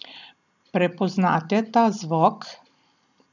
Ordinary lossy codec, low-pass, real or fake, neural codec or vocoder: none; 7.2 kHz; real; none